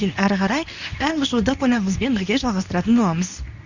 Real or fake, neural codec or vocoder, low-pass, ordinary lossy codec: fake; codec, 24 kHz, 0.9 kbps, WavTokenizer, medium speech release version 2; 7.2 kHz; none